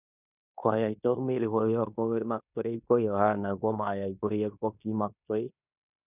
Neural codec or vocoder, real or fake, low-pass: codec, 16 kHz in and 24 kHz out, 0.9 kbps, LongCat-Audio-Codec, fine tuned four codebook decoder; fake; 3.6 kHz